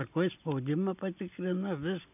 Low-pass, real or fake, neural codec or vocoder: 3.6 kHz; real; none